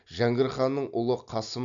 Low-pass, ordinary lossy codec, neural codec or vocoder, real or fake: 7.2 kHz; AAC, 64 kbps; none; real